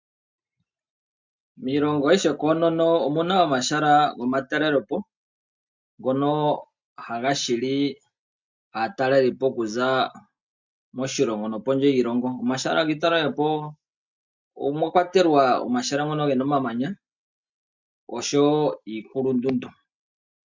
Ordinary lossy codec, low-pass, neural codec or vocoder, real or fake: MP3, 64 kbps; 7.2 kHz; none; real